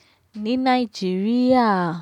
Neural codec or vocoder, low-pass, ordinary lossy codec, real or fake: none; 19.8 kHz; none; real